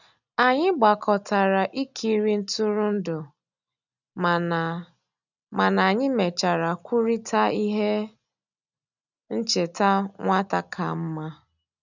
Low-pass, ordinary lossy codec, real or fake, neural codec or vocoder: 7.2 kHz; none; real; none